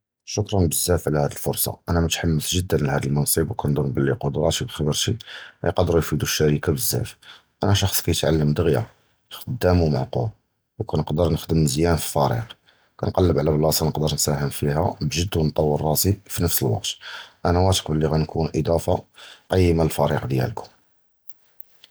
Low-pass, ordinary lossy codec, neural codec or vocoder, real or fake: none; none; none; real